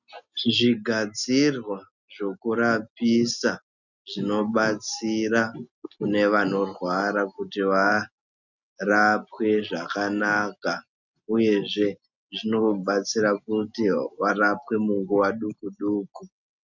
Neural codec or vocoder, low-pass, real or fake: none; 7.2 kHz; real